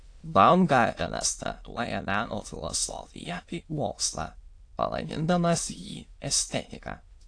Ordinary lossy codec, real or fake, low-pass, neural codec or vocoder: AAC, 48 kbps; fake; 9.9 kHz; autoencoder, 22.05 kHz, a latent of 192 numbers a frame, VITS, trained on many speakers